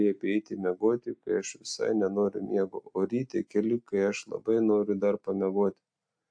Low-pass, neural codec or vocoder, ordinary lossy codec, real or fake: 9.9 kHz; none; AAC, 64 kbps; real